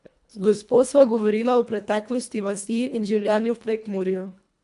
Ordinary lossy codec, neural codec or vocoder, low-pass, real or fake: none; codec, 24 kHz, 1.5 kbps, HILCodec; 10.8 kHz; fake